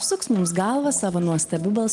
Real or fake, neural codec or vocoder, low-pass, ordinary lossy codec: real; none; 10.8 kHz; Opus, 24 kbps